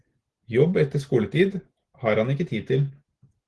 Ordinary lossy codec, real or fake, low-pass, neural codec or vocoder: Opus, 16 kbps; real; 10.8 kHz; none